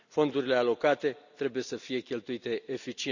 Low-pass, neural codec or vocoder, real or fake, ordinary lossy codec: 7.2 kHz; none; real; none